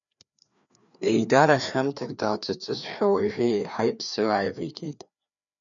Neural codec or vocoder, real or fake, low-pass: codec, 16 kHz, 2 kbps, FreqCodec, larger model; fake; 7.2 kHz